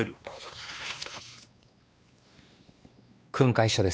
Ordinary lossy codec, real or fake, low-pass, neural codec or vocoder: none; fake; none; codec, 16 kHz, 2 kbps, X-Codec, WavLM features, trained on Multilingual LibriSpeech